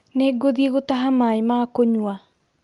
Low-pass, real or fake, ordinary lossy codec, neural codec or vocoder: 10.8 kHz; real; Opus, 32 kbps; none